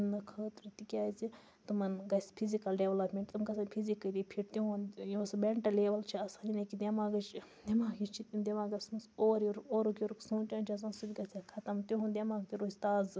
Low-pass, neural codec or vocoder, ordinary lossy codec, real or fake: none; none; none; real